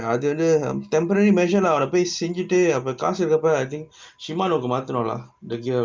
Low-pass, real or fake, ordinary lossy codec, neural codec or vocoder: 7.2 kHz; real; Opus, 24 kbps; none